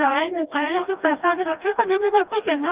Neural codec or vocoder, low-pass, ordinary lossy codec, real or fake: codec, 16 kHz, 1 kbps, FreqCodec, smaller model; 3.6 kHz; Opus, 32 kbps; fake